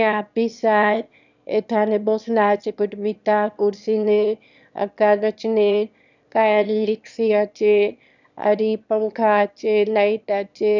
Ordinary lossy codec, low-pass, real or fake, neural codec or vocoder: none; 7.2 kHz; fake; autoencoder, 22.05 kHz, a latent of 192 numbers a frame, VITS, trained on one speaker